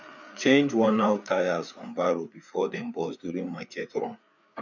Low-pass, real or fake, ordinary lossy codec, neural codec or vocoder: 7.2 kHz; fake; none; codec, 16 kHz, 4 kbps, FreqCodec, larger model